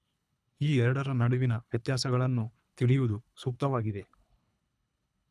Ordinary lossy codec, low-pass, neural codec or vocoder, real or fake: none; none; codec, 24 kHz, 3 kbps, HILCodec; fake